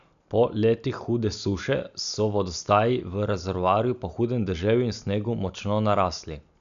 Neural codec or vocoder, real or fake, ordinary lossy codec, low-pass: none; real; none; 7.2 kHz